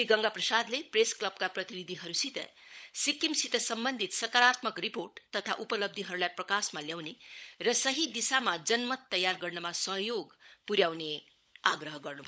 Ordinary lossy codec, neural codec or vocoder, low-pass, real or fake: none; codec, 16 kHz, 16 kbps, FunCodec, trained on LibriTTS, 50 frames a second; none; fake